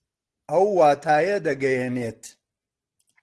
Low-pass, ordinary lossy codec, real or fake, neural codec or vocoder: 10.8 kHz; Opus, 16 kbps; real; none